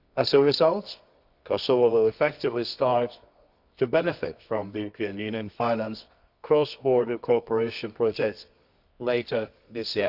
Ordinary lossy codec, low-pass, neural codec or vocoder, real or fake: Opus, 64 kbps; 5.4 kHz; codec, 24 kHz, 0.9 kbps, WavTokenizer, medium music audio release; fake